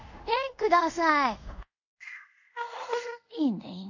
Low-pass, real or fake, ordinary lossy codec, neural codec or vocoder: 7.2 kHz; fake; none; codec, 24 kHz, 0.5 kbps, DualCodec